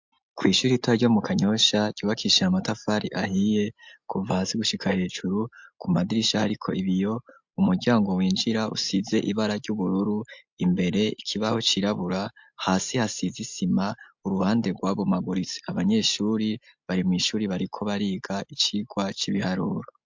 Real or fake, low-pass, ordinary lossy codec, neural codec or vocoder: real; 7.2 kHz; MP3, 64 kbps; none